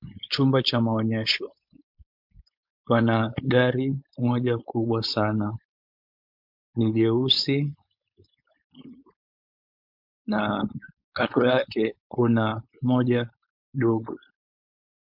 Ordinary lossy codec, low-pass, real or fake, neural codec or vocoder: MP3, 48 kbps; 5.4 kHz; fake; codec, 16 kHz, 4.8 kbps, FACodec